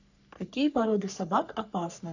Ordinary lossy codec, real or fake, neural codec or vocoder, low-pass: none; fake; codec, 44.1 kHz, 3.4 kbps, Pupu-Codec; 7.2 kHz